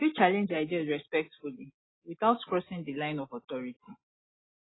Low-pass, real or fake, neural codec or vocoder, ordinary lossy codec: 7.2 kHz; real; none; AAC, 16 kbps